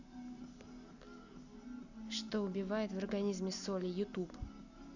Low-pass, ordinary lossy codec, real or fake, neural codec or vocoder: 7.2 kHz; none; real; none